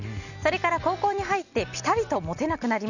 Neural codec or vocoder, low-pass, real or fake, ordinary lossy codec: none; 7.2 kHz; real; none